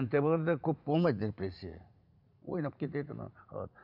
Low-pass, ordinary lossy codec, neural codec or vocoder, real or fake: 5.4 kHz; none; vocoder, 44.1 kHz, 80 mel bands, Vocos; fake